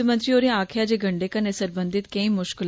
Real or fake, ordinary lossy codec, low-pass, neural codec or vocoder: real; none; none; none